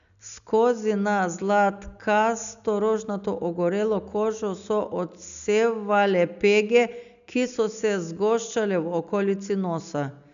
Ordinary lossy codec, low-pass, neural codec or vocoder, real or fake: none; 7.2 kHz; none; real